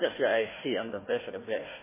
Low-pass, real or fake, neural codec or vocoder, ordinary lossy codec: 3.6 kHz; fake; codec, 16 kHz, 1 kbps, FunCodec, trained on Chinese and English, 50 frames a second; MP3, 16 kbps